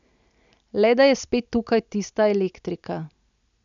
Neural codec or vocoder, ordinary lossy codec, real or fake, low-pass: none; none; real; 7.2 kHz